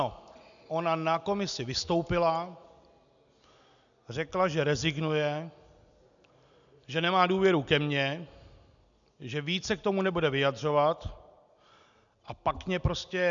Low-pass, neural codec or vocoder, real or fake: 7.2 kHz; none; real